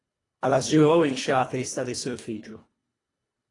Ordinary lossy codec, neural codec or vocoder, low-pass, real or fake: AAC, 32 kbps; codec, 24 kHz, 1.5 kbps, HILCodec; 10.8 kHz; fake